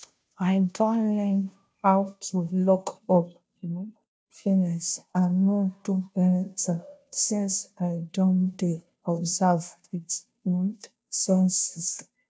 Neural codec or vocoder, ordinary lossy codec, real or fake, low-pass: codec, 16 kHz, 0.5 kbps, FunCodec, trained on Chinese and English, 25 frames a second; none; fake; none